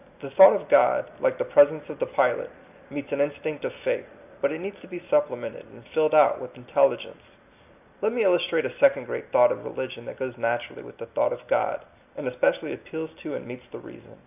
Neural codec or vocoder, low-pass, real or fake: none; 3.6 kHz; real